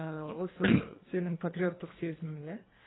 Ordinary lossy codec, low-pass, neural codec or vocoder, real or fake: AAC, 16 kbps; 7.2 kHz; codec, 24 kHz, 1.5 kbps, HILCodec; fake